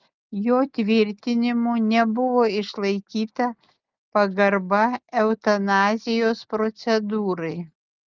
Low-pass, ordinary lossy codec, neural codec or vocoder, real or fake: 7.2 kHz; Opus, 32 kbps; none; real